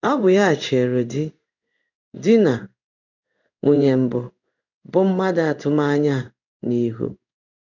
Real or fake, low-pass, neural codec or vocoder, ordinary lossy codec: fake; 7.2 kHz; codec, 16 kHz in and 24 kHz out, 1 kbps, XY-Tokenizer; none